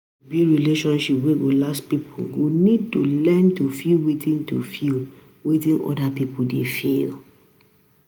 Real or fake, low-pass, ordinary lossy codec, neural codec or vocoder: real; none; none; none